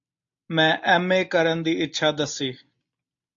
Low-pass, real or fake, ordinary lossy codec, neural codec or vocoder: 7.2 kHz; real; AAC, 64 kbps; none